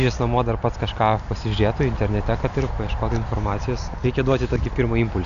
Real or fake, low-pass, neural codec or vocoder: real; 7.2 kHz; none